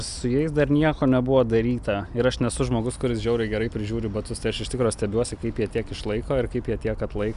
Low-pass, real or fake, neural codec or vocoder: 10.8 kHz; real; none